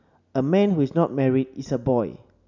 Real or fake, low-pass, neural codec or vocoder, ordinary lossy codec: real; 7.2 kHz; none; none